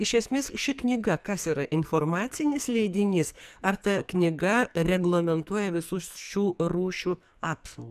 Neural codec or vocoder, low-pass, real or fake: codec, 44.1 kHz, 2.6 kbps, SNAC; 14.4 kHz; fake